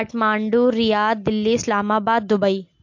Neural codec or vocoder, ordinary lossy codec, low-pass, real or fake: none; MP3, 48 kbps; 7.2 kHz; real